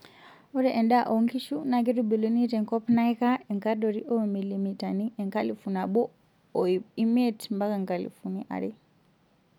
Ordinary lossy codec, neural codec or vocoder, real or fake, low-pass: none; none; real; 19.8 kHz